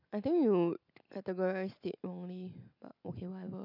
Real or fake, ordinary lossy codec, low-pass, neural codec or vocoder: real; none; 5.4 kHz; none